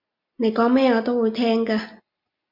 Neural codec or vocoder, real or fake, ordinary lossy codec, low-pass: none; real; MP3, 48 kbps; 5.4 kHz